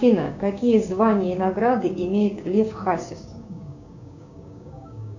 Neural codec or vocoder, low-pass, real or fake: codec, 16 kHz, 6 kbps, DAC; 7.2 kHz; fake